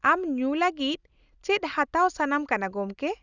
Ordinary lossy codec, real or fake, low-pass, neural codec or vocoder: none; real; 7.2 kHz; none